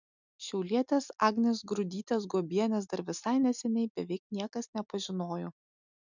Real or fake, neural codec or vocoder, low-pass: real; none; 7.2 kHz